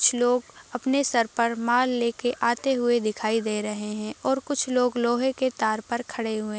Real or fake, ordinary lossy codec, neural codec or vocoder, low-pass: real; none; none; none